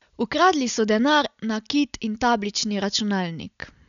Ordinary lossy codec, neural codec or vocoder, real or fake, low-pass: none; none; real; 7.2 kHz